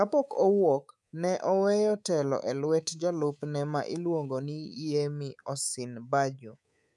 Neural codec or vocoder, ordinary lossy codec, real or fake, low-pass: codec, 24 kHz, 3.1 kbps, DualCodec; none; fake; none